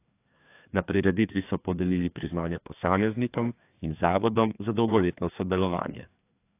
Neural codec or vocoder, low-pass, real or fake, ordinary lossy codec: codec, 16 kHz, 2 kbps, FreqCodec, larger model; 3.6 kHz; fake; AAC, 24 kbps